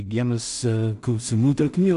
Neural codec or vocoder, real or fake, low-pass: codec, 16 kHz in and 24 kHz out, 0.4 kbps, LongCat-Audio-Codec, two codebook decoder; fake; 10.8 kHz